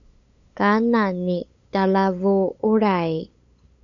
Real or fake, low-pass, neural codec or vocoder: fake; 7.2 kHz; codec, 16 kHz, 8 kbps, FunCodec, trained on LibriTTS, 25 frames a second